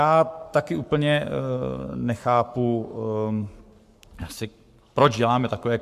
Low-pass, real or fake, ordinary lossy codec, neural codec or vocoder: 14.4 kHz; fake; MP3, 96 kbps; codec, 44.1 kHz, 7.8 kbps, Pupu-Codec